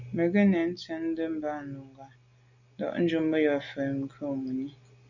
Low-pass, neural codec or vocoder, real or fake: 7.2 kHz; none; real